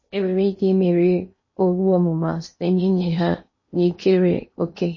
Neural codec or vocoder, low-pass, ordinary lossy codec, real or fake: codec, 16 kHz in and 24 kHz out, 0.8 kbps, FocalCodec, streaming, 65536 codes; 7.2 kHz; MP3, 32 kbps; fake